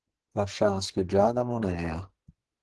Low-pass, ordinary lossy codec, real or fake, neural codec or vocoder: 10.8 kHz; Opus, 16 kbps; fake; codec, 44.1 kHz, 2.6 kbps, SNAC